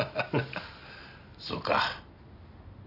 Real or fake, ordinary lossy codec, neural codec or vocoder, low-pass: real; none; none; 5.4 kHz